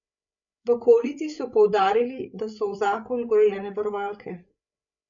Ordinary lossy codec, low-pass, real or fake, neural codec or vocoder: none; 7.2 kHz; fake; codec, 16 kHz, 8 kbps, FreqCodec, larger model